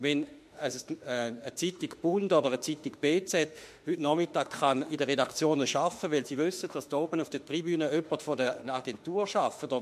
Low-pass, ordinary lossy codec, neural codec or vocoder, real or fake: 14.4 kHz; MP3, 64 kbps; autoencoder, 48 kHz, 32 numbers a frame, DAC-VAE, trained on Japanese speech; fake